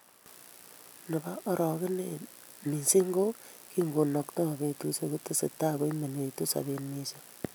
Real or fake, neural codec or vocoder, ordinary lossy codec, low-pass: real; none; none; none